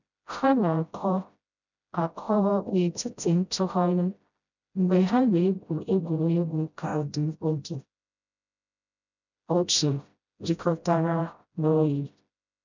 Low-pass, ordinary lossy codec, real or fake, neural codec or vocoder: 7.2 kHz; none; fake; codec, 16 kHz, 0.5 kbps, FreqCodec, smaller model